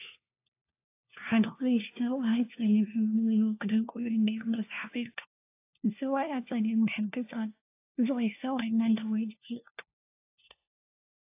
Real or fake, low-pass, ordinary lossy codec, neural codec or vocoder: fake; 3.6 kHz; AAC, 24 kbps; codec, 16 kHz, 1 kbps, FunCodec, trained on LibriTTS, 50 frames a second